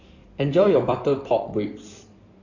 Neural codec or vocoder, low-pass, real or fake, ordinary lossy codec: codec, 16 kHz in and 24 kHz out, 2.2 kbps, FireRedTTS-2 codec; 7.2 kHz; fake; none